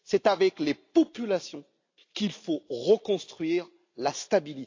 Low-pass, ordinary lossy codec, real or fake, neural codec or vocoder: 7.2 kHz; AAC, 48 kbps; real; none